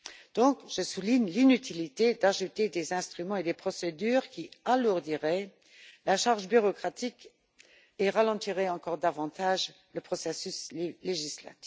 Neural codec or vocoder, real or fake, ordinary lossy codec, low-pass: none; real; none; none